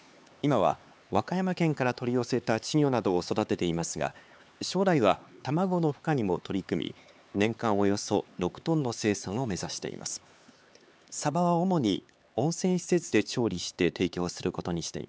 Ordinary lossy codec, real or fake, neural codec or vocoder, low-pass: none; fake; codec, 16 kHz, 4 kbps, X-Codec, HuBERT features, trained on LibriSpeech; none